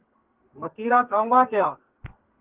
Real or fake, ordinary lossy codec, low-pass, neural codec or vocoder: fake; Opus, 16 kbps; 3.6 kHz; codec, 32 kHz, 1.9 kbps, SNAC